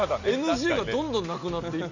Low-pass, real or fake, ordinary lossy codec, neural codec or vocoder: 7.2 kHz; real; none; none